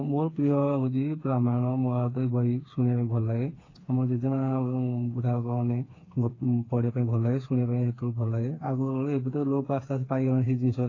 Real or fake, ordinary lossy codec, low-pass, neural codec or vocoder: fake; AAC, 32 kbps; 7.2 kHz; codec, 16 kHz, 4 kbps, FreqCodec, smaller model